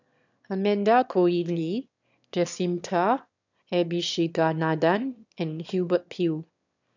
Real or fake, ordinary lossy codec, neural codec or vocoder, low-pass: fake; none; autoencoder, 22.05 kHz, a latent of 192 numbers a frame, VITS, trained on one speaker; 7.2 kHz